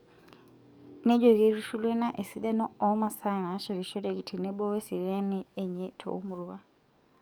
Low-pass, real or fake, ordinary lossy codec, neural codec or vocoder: none; fake; none; codec, 44.1 kHz, 7.8 kbps, DAC